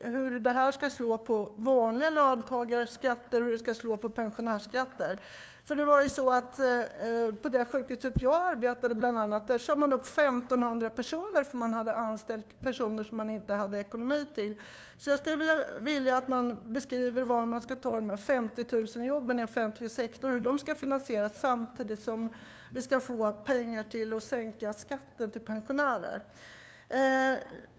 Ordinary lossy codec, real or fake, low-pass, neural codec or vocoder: none; fake; none; codec, 16 kHz, 2 kbps, FunCodec, trained on LibriTTS, 25 frames a second